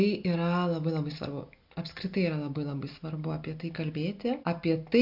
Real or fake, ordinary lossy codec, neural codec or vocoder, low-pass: real; MP3, 48 kbps; none; 5.4 kHz